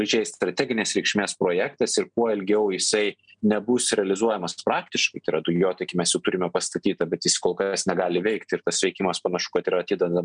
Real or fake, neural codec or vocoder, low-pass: real; none; 9.9 kHz